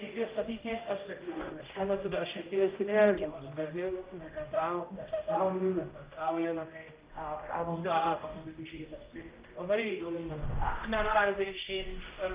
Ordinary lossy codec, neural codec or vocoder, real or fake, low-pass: Opus, 32 kbps; codec, 16 kHz, 0.5 kbps, X-Codec, HuBERT features, trained on general audio; fake; 3.6 kHz